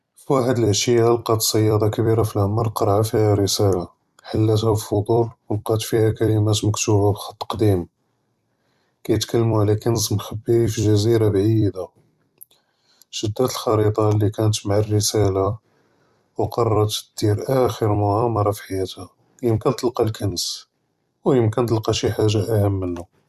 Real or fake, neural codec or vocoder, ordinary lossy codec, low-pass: fake; vocoder, 44.1 kHz, 128 mel bands every 256 samples, BigVGAN v2; Opus, 64 kbps; 14.4 kHz